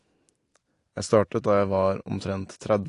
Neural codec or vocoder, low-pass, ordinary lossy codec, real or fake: none; 10.8 kHz; AAC, 48 kbps; real